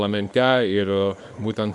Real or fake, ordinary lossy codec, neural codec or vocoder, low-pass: fake; Opus, 64 kbps; codec, 24 kHz, 0.9 kbps, WavTokenizer, small release; 10.8 kHz